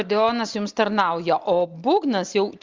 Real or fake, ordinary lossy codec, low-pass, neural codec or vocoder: real; Opus, 32 kbps; 7.2 kHz; none